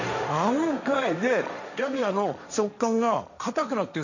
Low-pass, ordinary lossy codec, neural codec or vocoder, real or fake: 7.2 kHz; none; codec, 16 kHz, 1.1 kbps, Voila-Tokenizer; fake